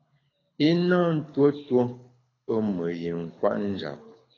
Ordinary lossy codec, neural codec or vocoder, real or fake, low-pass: AAC, 32 kbps; codec, 24 kHz, 6 kbps, HILCodec; fake; 7.2 kHz